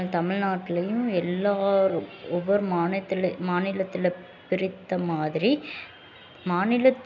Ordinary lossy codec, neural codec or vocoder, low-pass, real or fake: none; none; 7.2 kHz; real